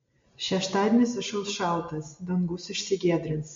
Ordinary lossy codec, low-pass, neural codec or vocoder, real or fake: MP3, 48 kbps; 7.2 kHz; none; real